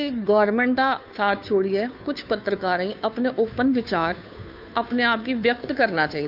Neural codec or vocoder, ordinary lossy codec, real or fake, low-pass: codec, 16 kHz, 2 kbps, FunCodec, trained on Chinese and English, 25 frames a second; none; fake; 5.4 kHz